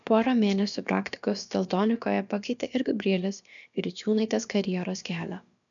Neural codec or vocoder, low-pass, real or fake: codec, 16 kHz, about 1 kbps, DyCAST, with the encoder's durations; 7.2 kHz; fake